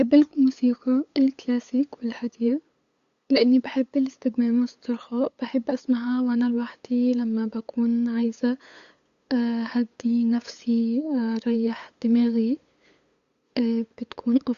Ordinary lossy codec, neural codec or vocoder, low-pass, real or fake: Opus, 64 kbps; codec, 16 kHz, 8 kbps, FunCodec, trained on LibriTTS, 25 frames a second; 7.2 kHz; fake